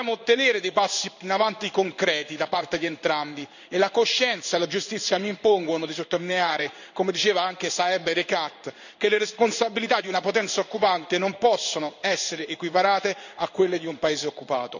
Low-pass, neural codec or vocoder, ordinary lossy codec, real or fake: 7.2 kHz; codec, 16 kHz in and 24 kHz out, 1 kbps, XY-Tokenizer; none; fake